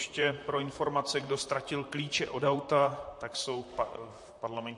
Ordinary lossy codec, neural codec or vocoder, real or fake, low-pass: MP3, 48 kbps; vocoder, 44.1 kHz, 128 mel bands, Pupu-Vocoder; fake; 10.8 kHz